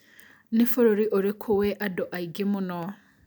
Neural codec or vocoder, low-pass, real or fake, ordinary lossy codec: none; none; real; none